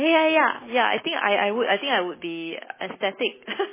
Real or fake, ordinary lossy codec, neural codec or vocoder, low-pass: real; MP3, 16 kbps; none; 3.6 kHz